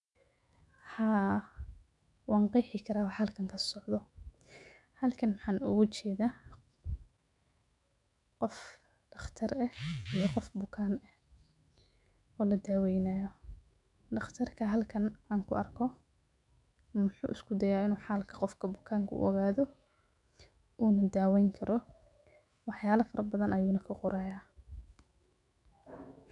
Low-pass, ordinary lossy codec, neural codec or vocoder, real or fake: 10.8 kHz; none; autoencoder, 48 kHz, 128 numbers a frame, DAC-VAE, trained on Japanese speech; fake